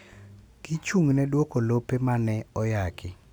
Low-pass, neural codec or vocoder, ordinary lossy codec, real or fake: none; none; none; real